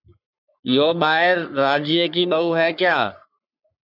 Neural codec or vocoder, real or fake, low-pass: codec, 44.1 kHz, 3.4 kbps, Pupu-Codec; fake; 5.4 kHz